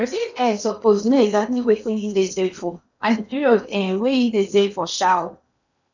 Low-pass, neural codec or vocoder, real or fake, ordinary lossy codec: 7.2 kHz; codec, 16 kHz in and 24 kHz out, 0.8 kbps, FocalCodec, streaming, 65536 codes; fake; none